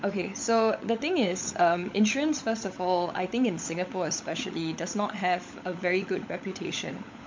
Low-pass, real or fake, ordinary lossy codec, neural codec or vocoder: 7.2 kHz; fake; MP3, 64 kbps; codec, 16 kHz, 16 kbps, FunCodec, trained on LibriTTS, 50 frames a second